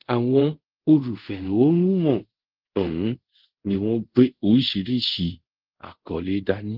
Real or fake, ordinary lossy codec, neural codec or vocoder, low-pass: fake; Opus, 16 kbps; codec, 24 kHz, 0.5 kbps, DualCodec; 5.4 kHz